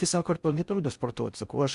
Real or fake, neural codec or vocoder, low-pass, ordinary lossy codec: fake; codec, 16 kHz in and 24 kHz out, 0.6 kbps, FocalCodec, streaming, 4096 codes; 10.8 kHz; Opus, 64 kbps